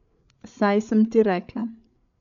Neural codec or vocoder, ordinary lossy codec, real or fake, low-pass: codec, 16 kHz, 8 kbps, FreqCodec, larger model; none; fake; 7.2 kHz